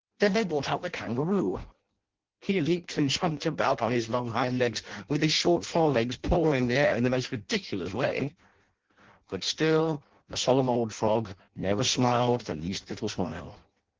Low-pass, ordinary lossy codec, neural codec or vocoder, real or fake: 7.2 kHz; Opus, 16 kbps; codec, 16 kHz in and 24 kHz out, 0.6 kbps, FireRedTTS-2 codec; fake